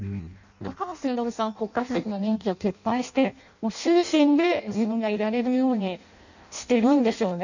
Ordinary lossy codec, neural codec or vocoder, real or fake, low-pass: none; codec, 16 kHz in and 24 kHz out, 0.6 kbps, FireRedTTS-2 codec; fake; 7.2 kHz